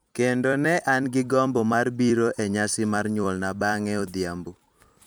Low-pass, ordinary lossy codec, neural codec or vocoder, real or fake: none; none; vocoder, 44.1 kHz, 128 mel bands every 256 samples, BigVGAN v2; fake